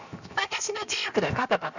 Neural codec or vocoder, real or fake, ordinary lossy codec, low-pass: codec, 16 kHz, 0.7 kbps, FocalCodec; fake; none; 7.2 kHz